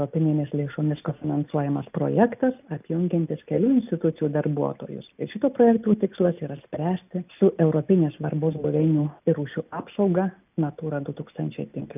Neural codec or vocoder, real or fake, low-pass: none; real; 3.6 kHz